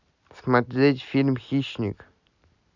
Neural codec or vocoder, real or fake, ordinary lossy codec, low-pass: none; real; none; 7.2 kHz